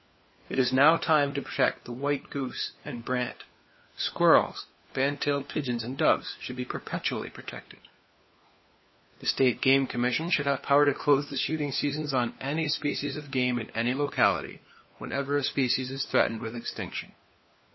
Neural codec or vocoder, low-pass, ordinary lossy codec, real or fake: codec, 16 kHz, 4 kbps, FunCodec, trained on LibriTTS, 50 frames a second; 7.2 kHz; MP3, 24 kbps; fake